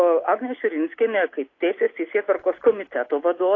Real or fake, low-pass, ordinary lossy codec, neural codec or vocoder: real; 7.2 kHz; AAC, 32 kbps; none